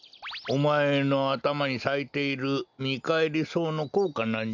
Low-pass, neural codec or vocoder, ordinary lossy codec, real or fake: 7.2 kHz; none; none; real